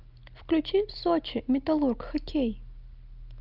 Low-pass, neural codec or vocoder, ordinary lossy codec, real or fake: 5.4 kHz; none; Opus, 24 kbps; real